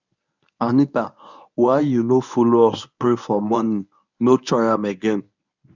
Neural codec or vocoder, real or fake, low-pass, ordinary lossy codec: codec, 24 kHz, 0.9 kbps, WavTokenizer, medium speech release version 1; fake; 7.2 kHz; none